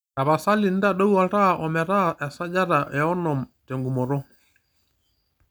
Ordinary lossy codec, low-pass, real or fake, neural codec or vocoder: none; none; real; none